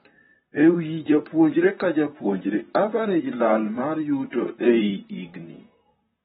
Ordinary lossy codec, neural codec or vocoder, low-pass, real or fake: AAC, 16 kbps; vocoder, 44.1 kHz, 128 mel bands, Pupu-Vocoder; 19.8 kHz; fake